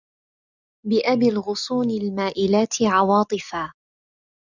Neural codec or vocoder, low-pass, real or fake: none; 7.2 kHz; real